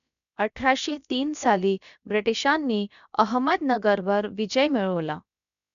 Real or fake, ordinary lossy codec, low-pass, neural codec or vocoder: fake; none; 7.2 kHz; codec, 16 kHz, about 1 kbps, DyCAST, with the encoder's durations